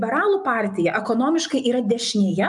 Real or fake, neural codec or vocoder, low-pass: real; none; 10.8 kHz